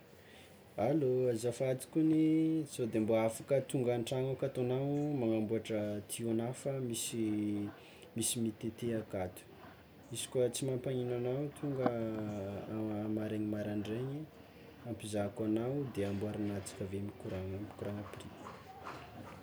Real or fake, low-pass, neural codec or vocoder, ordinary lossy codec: real; none; none; none